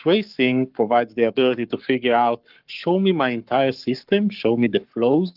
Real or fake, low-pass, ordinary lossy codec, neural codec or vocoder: fake; 5.4 kHz; Opus, 32 kbps; codec, 44.1 kHz, 7.8 kbps, Pupu-Codec